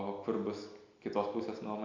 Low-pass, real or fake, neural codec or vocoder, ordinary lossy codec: 7.2 kHz; real; none; MP3, 64 kbps